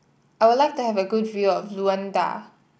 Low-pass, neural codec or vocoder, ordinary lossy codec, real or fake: none; none; none; real